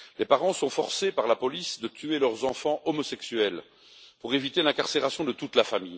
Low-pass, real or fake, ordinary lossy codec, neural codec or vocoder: none; real; none; none